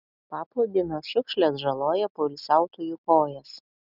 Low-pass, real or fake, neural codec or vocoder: 5.4 kHz; real; none